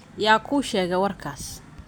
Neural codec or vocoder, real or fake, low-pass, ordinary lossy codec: none; real; none; none